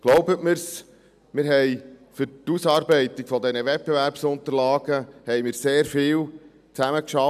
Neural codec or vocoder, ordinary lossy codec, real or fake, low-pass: none; none; real; 14.4 kHz